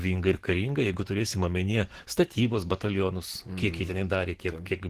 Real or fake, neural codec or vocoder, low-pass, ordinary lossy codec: fake; codec, 44.1 kHz, 7.8 kbps, DAC; 14.4 kHz; Opus, 16 kbps